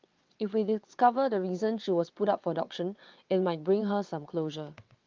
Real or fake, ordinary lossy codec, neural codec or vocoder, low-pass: fake; Opus, 32 kbps; vocoder, 22.05 kHz, 80 mel bands, WaveNeXt; 7.2 kHz